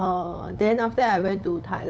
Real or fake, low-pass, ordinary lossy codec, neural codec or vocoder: fake; none; none; codec, 16 kHz, 8 kbps, FunCodec, trained on LibriTTS, 25 frames a second